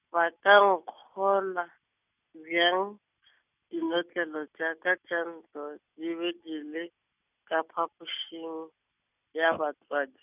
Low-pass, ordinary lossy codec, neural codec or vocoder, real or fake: 3.6 kHz; none; none; real